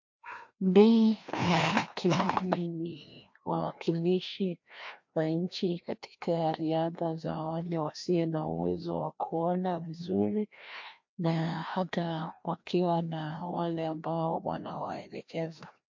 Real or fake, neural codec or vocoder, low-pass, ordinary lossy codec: fake; codec, 16 kHz, 1 kbps, FreqCodec, larger model; 7.2 kHz; MP3, 48 kbps